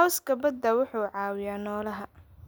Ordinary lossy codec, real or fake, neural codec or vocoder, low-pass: none; real; none; none